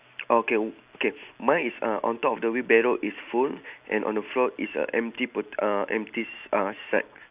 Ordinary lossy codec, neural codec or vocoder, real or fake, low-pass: Opus, 64 kbps; none; real; 3.6 kHz